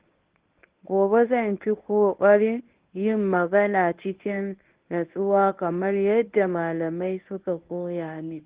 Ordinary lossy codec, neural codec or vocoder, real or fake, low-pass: Opus, 16 kbps; codec, 24 kHz, 0.9 kbps, WavTokenizer, medium speech release version 1; fake; 3.6 kHz